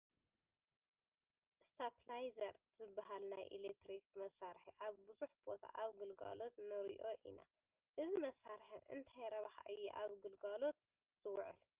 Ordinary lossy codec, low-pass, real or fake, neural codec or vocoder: Opus, 32 kbps; 3.6 kHz; real; none